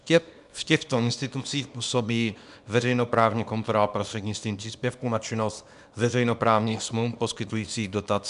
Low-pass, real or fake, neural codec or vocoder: 10.8 kHz; fake; codec, 24 kHz, 0.9 kbps, WavTokenizer, small release